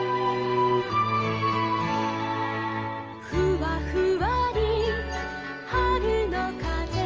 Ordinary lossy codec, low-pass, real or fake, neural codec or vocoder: Opus, 24 kbps; 7.2 kHz; real; none